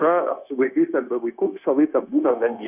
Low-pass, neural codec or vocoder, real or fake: 3.6 kHz; codec, 16 kHz, 0.9 kbps, LongCat-Audio-Codec; fake